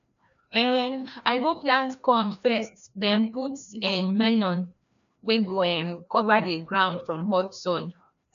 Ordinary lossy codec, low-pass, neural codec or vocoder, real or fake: none; 7.2 kHz; codec, 16 kHz, 1 kbps, FreqCodec, larger model; fake